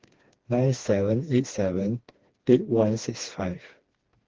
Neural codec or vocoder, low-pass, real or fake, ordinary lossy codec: codec, 16 kHz, 2 kbps, FreqCodec, smaller model; 7.2 kHz; fake; Opus, 16 kbps